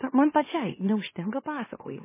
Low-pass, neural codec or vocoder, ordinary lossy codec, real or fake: 3.6 kHz; autoencoder, 44.1 kHz, a latent of 192 numbers a frame, MeloTTS; MP3, 16 kbps; fake